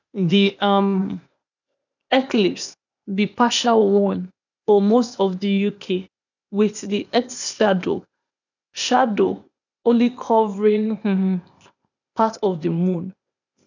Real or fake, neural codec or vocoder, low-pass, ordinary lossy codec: fake; codec, 16 kHz, 0.8 kbps, ZipCodec; 7.2 kHz; none